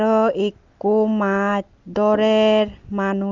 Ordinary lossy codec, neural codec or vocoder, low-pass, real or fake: Opus, 24 kbps; none; 7.2 kHz; real